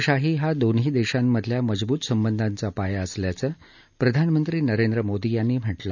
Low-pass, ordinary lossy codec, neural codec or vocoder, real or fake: 7.2 kHz; none; none; real